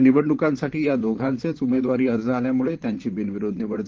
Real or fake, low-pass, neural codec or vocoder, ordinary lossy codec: fake; 7.2 kHz; vocoder, 44.1 kHz, 128 mel bands, Pupu-Vocoder; Opus, 16 kbps